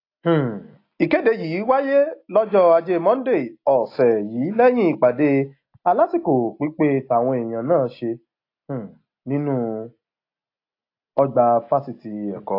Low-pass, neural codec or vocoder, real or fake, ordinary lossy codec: 5.4 kHz; none; real; AAC, 32 kbps